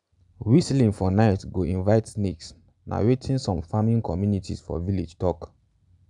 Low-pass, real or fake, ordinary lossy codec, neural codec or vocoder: 10.8 kHz; real; none; none